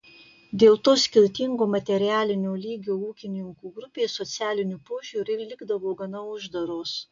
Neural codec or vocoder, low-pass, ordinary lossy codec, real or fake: none; 7.2 kHz; AAC, 64 kbps; real